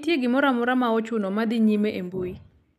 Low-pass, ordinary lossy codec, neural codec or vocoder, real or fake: 14.4 kHz; none; none; real